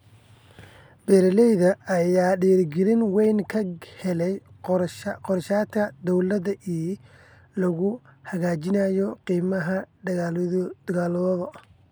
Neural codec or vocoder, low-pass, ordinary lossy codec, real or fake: none; none; none; real